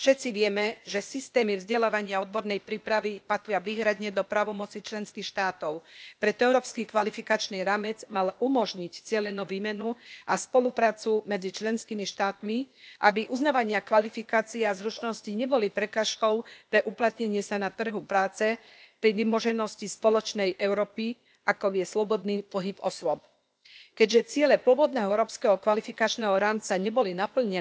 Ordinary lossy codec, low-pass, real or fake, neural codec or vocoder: none; none; fake; codec, 16 kHz, 0.8 kbps, ZipCodec